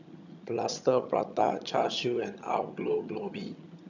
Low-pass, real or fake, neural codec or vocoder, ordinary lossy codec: 7.2 kHz; fake; vocoder, 22.05 kHz, 80 mel bands, HiFi-GAN; none